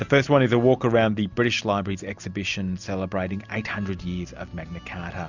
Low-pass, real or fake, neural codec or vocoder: 7.2 kHz; real; none